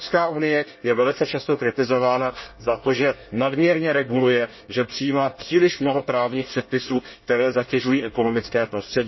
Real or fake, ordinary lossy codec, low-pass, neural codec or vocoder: fake; MP3, 24 kbps; 7.2 kHz; codec, 24 kHz, 1 kbps, SNAC